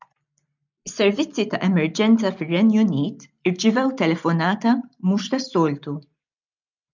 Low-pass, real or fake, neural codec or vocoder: 7.2 kHz; real; none